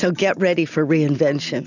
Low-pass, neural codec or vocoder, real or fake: 7.2 kHz; none; real